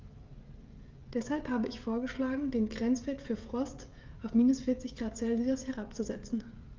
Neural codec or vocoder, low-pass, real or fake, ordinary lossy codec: codec, 16 kHz, 16 kbps, FreqCodec, smaller model; 7.2 kHz; fake; Opus, 24 kbps